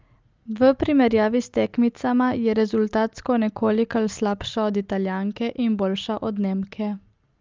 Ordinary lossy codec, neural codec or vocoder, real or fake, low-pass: Opus, 24 kbps; none; real; 7.2 kHz